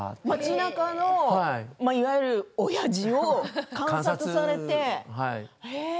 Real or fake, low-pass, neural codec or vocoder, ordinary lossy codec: real; none; none; none